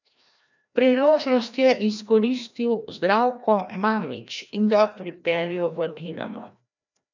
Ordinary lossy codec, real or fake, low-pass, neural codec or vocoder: none; fake; 7.2 kHz; codec, 16 kHz, 1 kbps, FreqCodec, larger model